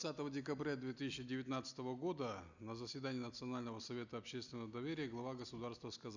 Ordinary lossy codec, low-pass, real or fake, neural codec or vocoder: none; 7.2 kHz; real; none